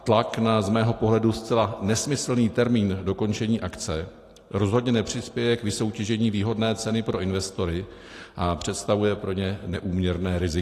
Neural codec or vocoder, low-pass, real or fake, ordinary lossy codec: none; 14.4 kHz; real; AAC, 48 kbps